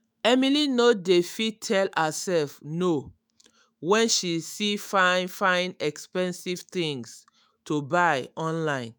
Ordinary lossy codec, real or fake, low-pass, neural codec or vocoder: none; fake; none; autoencoder, 48 kHz, 128 numbers a frame, DAC-VAE, trained on Japanese speech